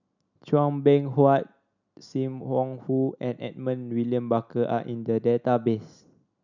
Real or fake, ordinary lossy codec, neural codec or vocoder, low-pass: real; none; none; 7.2 kHz